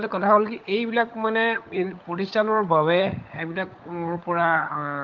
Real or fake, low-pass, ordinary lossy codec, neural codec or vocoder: fake; 7.2 kHz; Opus, 32 kbps; codec, 16 kHz, 16 kbps, FunCodec, trained on LibriTTS, 50 frames a second